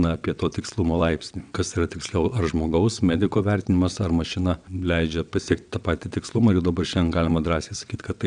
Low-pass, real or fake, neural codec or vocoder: 9.9 kHz; fake; vocoder, 22.05 kHz, 80 mel bands, WaveNeXt